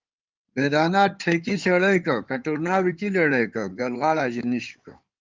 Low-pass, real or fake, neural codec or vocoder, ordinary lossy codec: 7.2 kHz; fake; codec, 16 kHz in and 24 kHz out, 2.2 kbps, FireRedTTS-2 codec; Opus, 32 kbps